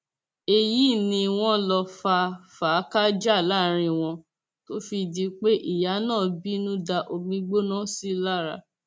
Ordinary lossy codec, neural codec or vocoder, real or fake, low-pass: none; none; real; none